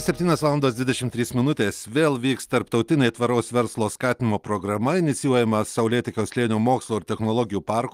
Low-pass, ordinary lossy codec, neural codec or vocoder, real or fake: 14.4 kHz; Opus, 32 kbps; none; real